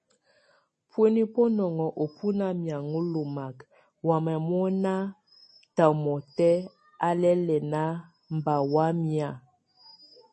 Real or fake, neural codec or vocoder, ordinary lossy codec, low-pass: real; none; MP3, 32 kbps; 10.8 kHz